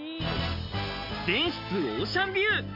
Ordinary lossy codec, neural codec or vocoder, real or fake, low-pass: none; none; real; 5.4 kHz